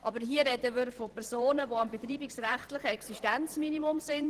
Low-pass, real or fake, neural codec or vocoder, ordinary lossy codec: 14.4 kHz; fake; vocoder, 44.1 kHz, 128 mel bands every 512 samples, BigVGAN v2; Opus, 16 kbps